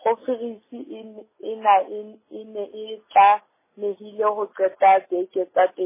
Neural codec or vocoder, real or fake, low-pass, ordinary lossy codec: none; real; 3.6 kHz; MP3, 16 kbps